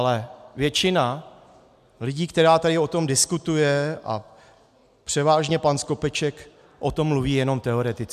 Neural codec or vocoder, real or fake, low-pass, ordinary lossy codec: none; real; 14.4 kHz; MP3, 96 kbps